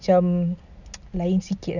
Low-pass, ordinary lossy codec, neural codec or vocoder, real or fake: 7.2 kHz; none; none; real